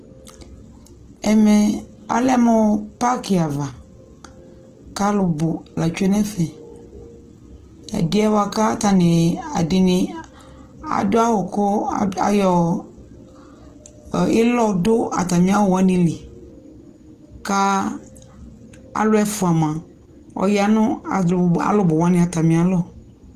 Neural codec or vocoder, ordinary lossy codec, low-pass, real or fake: none; Opus, 16 kbps; 14.4 kHz; real